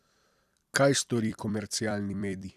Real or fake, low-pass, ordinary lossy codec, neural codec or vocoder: fake; 14.4 kHz; none; vocoder, 44.1 kHz, 128 mel bands every 256 samples, BigVGAN v2